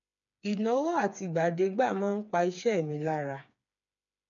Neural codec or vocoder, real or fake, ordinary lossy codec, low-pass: codec, 16 kHz, 4 kbps, FreqCodec, smaller model; fake; none; 7.2 kHz